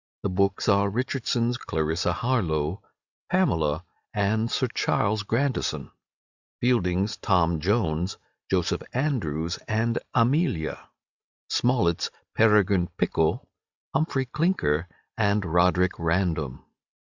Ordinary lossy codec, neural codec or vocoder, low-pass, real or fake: Opus, 64 kbps; none; 7.2 kHz; real